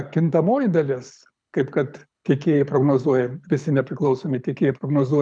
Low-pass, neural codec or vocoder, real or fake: 9.9 kHz; codec, 24 kHz, 6 kbps, HILCodec; fake